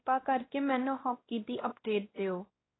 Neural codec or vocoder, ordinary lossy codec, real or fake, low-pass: codec, 16 kHz, 1 kbps, X-Codec, WavLM features, trained on Multilingual LibriSpeech; AAC, 16 kbps; fake; 7.2 kHz